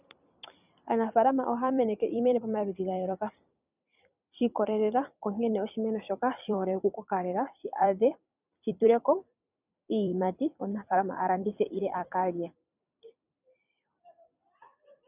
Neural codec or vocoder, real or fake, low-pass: vocoder, 44.1 kHz, 128 mel bands every 256 samples, BigVGAN v2; fake; 3.6 kHz